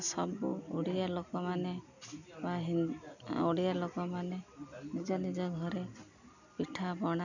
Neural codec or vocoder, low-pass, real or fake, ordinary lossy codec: none; 7.2 kHz; real; none